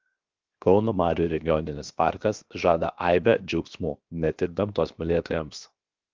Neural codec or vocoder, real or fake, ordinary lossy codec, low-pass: codec, 16 kHz, 0.7 kbps, FocalCodec; fake; Opus, 24 kbps; 7.2 kHz